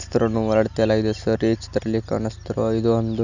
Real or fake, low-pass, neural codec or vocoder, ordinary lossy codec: real; 7.2 kHz; none; none